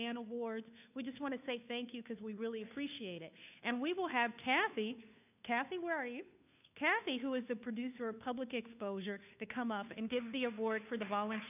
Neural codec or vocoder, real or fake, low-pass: codec, 16 kHz, 2 kbps, FunCodec, trained on Chinese and English, 25 frames a second; fake; 3.6 kHz